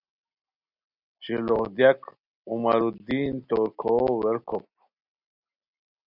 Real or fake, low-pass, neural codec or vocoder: real; 5.4 kHz; none